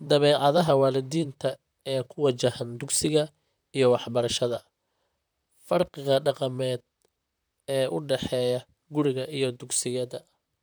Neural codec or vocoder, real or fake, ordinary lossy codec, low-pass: vocoder, 44.1 kHz, 128 mel bands, Pupu-Vocoder; fake; none; none